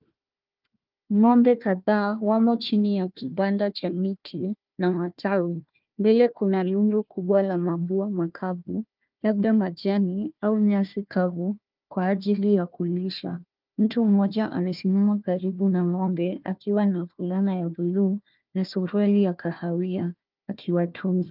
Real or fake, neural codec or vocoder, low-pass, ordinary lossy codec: fake; codec, 16 kHz, 1 kbps, FunCodec, trained on Chinese and English, 50 frames a second; 5.4 kHz; Opus, 24 kbps